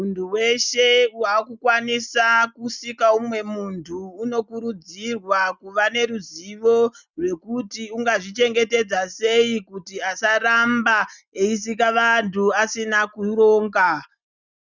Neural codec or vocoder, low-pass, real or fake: none; 7.2 kHz; real